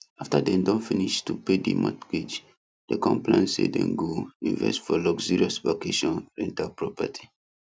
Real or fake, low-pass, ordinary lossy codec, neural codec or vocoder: real; none; none; none